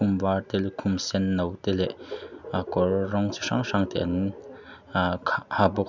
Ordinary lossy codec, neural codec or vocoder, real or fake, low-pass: none; none; real; 7.2 kHz